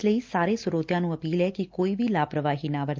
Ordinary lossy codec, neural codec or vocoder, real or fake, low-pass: Opus, 24 kbps; none; real; 7.2 kHz